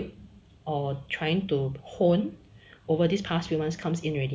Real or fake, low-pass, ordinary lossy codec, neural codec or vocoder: real; none; none; none